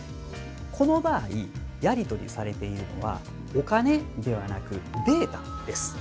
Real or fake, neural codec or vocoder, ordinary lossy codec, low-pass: real; none; none; none